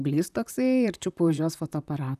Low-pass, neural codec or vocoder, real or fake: 14.4 kHz; vocoder, 44.1 kHz, 128 mel bands, Pupu-Vocoder; fake